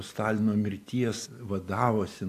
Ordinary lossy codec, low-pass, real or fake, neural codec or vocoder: AAC, 64 kbps; 14.4 kHz; real; none